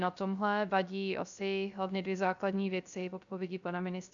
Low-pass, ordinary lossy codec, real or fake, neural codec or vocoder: 7.2 kHz; MP3, 96 kbps; fake; codec, 16 kHz, 0.3 kbps, FocalCodec